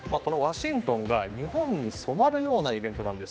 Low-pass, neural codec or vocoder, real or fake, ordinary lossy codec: none; codec, 16 kHz, 2 kbps, X-Codec, HuBERT features, trained on general audio; fake; none